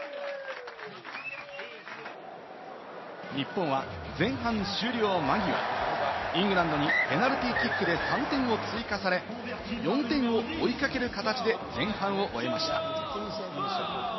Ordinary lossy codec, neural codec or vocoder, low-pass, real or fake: MP3, 24 kbps; none; 7.2 kHz; real